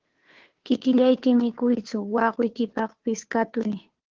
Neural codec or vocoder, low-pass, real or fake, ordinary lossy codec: codec, 16 kHz, 2 kbps, FunCodec, trained on Chinese and English, 25 frames a second; 7.2 kHz; fake; Opus, 16 kbps